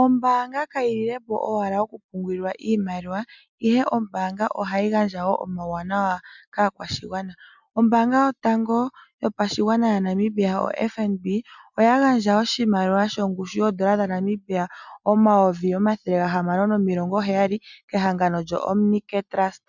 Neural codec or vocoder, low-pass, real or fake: none; 7.2 kHz; real